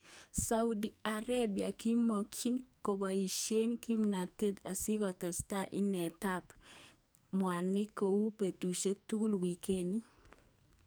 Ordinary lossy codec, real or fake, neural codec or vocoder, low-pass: none; fake; codec, 44.1 kHz, 2.6 kbps, SNAC; none